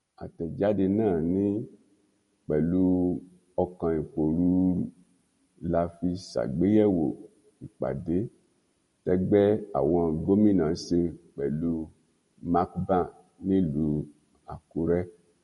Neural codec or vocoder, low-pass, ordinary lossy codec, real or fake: none; 14.4 kHz; MP3, 48 kbps; real